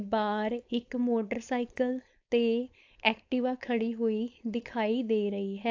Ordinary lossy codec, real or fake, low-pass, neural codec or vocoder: AAC, 48 kbps; fake; 7.2 kHz; codec, 16 kHz, 4.8 kbps, FACodec